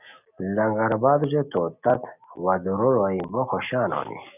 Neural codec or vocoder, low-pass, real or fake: none; 3.6 kHz; real